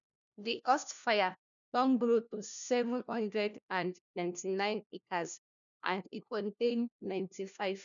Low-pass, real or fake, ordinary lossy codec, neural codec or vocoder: 7.2 kHz; fake; none; codec, 16 kHz, 1 kbps, FunCodec, trained on LibriTTS, 50 frames a second